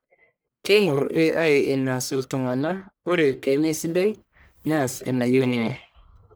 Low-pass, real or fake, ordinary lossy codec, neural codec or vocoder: none; fake; none; codec, 44.1 kHz, 1.7 kbps, Pupu-Codec